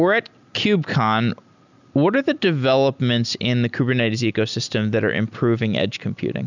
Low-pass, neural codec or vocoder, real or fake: 7.2 kHz; none; real